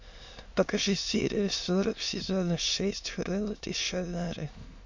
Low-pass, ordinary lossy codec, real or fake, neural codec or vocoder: 7.2 kHz; MP3, 48 kbps; fake; autoencoder, 22.05 kHz, a latent of 192 numbers a frame, VITS, trained on many speakers